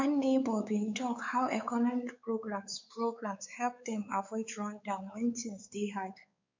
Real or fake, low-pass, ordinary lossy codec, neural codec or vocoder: fake; 7.2 kHz; MP3, 64 kbps; codec, 16 kHz, 4 kbps, X-Codec, WavLM features, trained on Multilingual LibriSpeech